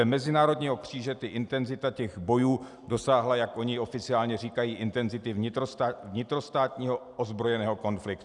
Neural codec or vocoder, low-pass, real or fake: none; 10.8 kHz; real